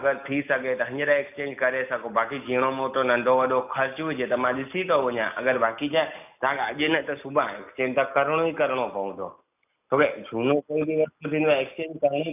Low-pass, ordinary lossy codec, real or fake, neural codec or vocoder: 3.6 kHz; none; real; none